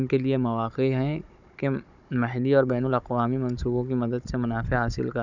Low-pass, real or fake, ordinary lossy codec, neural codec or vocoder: 7.2 kHz; fake; none; codec, 16 kHz, 16 kbps, FunCodec, trained on Chinese and English, 50 frames a second